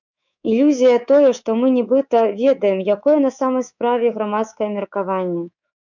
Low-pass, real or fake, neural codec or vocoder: 7.2 kHz; fake; codec, 24 kHz, 3.1 kbps, DualCodec